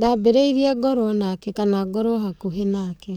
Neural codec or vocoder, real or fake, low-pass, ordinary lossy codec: codec, 44.1 kHz, 7.8 kbps, DAC; fake; 19.8 kHz; none